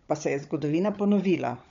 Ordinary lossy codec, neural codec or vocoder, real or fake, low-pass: MP3, 48 kbps; codec, 16 kHz, 16 kbps, FunCodec, trained on Chinese and English, 50 frames a second; fake; 7.2 kHz